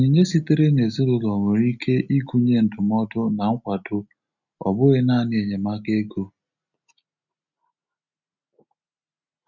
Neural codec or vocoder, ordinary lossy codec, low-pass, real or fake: none; none; 7.2 kHz; real